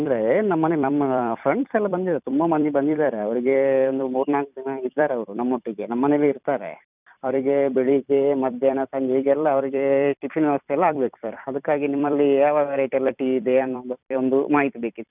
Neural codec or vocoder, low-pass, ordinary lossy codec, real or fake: vocoder, 44.1 kHz, 128 mel bands every 256 samples, BigVGAN v2; 3.6 kHz; none; fake